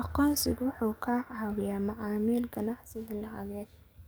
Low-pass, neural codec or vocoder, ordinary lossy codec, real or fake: none; codec, 44.1 kHz, 7.8 kbps, DAC; none; fake